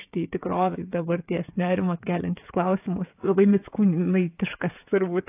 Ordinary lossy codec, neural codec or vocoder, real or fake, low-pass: AAC, 24 kbps; codec, 16 kHz, 16 kbps, FreqCodec, smaller model; fake; 3.6 kHz